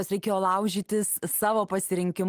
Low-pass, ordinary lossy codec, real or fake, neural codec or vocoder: 14.4 kHz; Opus, 24 kbps; real; none